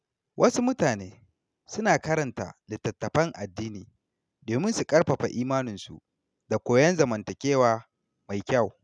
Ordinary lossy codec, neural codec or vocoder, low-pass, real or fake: none; none; none; real